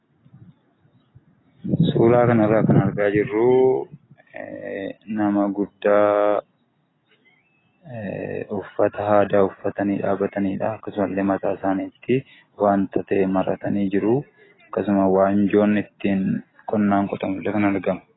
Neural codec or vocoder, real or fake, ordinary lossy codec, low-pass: none; real; AAC, 16 kbps; 7.2 kHz